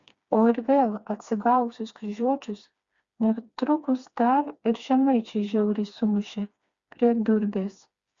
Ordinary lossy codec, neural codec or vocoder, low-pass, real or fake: Opus, 64 kbps; codec, 16 kHz, 2 kbps, FreqCodec, smaller model; 7.2 kHz; fake